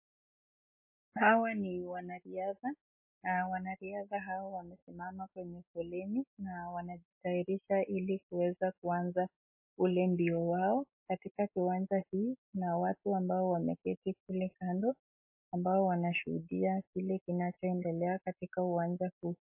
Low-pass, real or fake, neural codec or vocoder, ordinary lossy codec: 3.6 kHz; real; none; MP3, 24 kbps